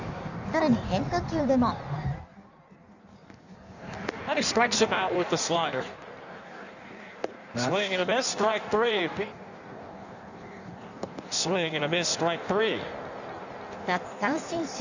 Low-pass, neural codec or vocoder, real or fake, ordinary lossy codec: 7.2 kHz; codec, 16 kHz in and 24 kHz out, 1.1 kbps, FireRedTTS-2 codec; fake; none